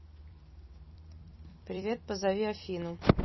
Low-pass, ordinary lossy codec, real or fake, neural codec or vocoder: 7.2 kHz; MP3, 24 kbps; fake; vocoder, 44.1 kHz, 128 mel bands every 256 samples, BigVGAN v2